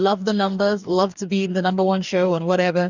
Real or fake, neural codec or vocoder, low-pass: fake; codec, 44.1 kHz, 2.6 kbps, DAC; 7.2 kHz